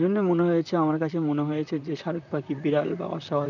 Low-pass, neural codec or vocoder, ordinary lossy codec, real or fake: 7.2 kHz; vocoder, 44.1 kHz, 128 mel bands, Pupu-Vocoder; none; fake